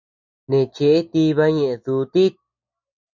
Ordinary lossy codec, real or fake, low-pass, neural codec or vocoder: MP3, 64 kbps; real; 7.2 kHz; none